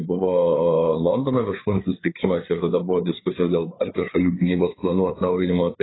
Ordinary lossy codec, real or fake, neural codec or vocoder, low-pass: AAC, 16 kbps; fake; codec, 16 kHz, 4 kbps, FreqCodec, larger model; 7.2 kHz